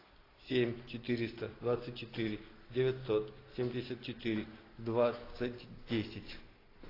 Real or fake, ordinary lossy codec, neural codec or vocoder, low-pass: real; AAC, 24 kbps; none; 5.4 kHz